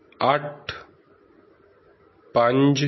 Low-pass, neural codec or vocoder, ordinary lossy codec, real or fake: 7.2 kHz; none; MP3, 24 kbps; real